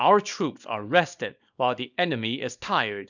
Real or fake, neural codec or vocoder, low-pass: fake; codec, 24 kHz, 0.9 kbps, WavTokenizer, small release; 7.2 kHz